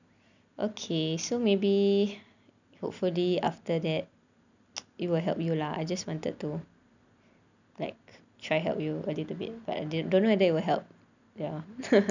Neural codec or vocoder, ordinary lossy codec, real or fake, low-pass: none; none; real; 7.2 kHz